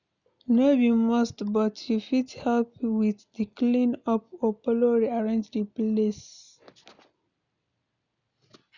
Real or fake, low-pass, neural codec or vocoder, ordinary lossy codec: real; 7.2 kHz; none; none